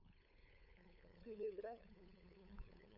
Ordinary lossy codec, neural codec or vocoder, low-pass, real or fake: none; codec, 16 kHz, 2 kbps, FunCodec, trained on LibriTTS, 25 frames a second; 5.4 kHz; fake